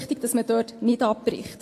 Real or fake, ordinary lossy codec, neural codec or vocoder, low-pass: real; AAC, 48 kbps; none; 14.4 kHz